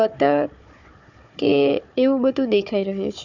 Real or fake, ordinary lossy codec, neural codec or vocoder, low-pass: fake; none; codec, 16 kHz, 4 kbps, FunCodec, trained on Chinese and English, 50 frames a second; 7.2 kHz